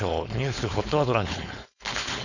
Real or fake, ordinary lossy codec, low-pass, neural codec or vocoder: fake; none; 7.2 kHz; codec, 16 kHz, 4.8 kbps, FACodec